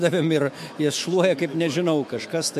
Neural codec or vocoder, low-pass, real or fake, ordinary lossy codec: autoencoder, 48 kHz, 128 numbers a frame, DAC-VAE, trained on Japanese speech; 14.4 kHz; fake; MP3, 64 kbps